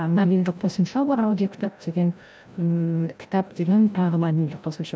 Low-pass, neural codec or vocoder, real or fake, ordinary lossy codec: none; codec, 16 kHz, 0.5 kbps, FreqCodec, larger model; fake; none